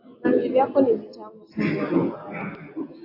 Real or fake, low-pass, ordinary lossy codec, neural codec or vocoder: real; 5.4 kHz; AAC, 32 kbps; none